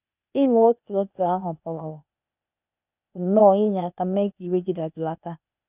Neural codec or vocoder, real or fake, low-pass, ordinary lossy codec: codec, 16 kHz, 0.8 kbps, ZipCodec; fake; 3.6 kHz; none